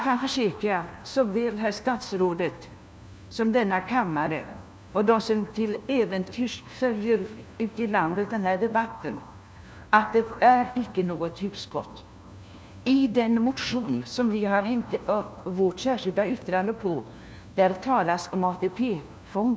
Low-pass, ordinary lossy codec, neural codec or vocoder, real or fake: none; none; codec, 16 kHz, 1 kbps, FunCodec, trained on LibriTTS, 50 frames a second; fake